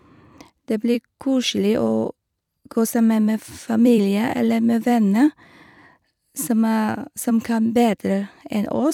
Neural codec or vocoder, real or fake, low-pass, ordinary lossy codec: vocoder, 44.1 kHz, 128 mel bands every 256 samples, BigVGAN v2; fake; 19.8 kHz; none